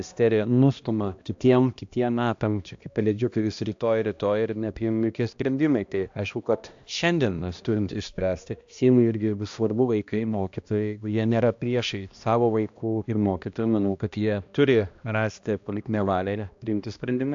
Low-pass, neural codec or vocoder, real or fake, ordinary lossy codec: 7.2 kHz; codec, 16 kHz, 1 kbps, X-Codec, HuBERT features, trained on balanced general audio; fake; AAC, 64 kbps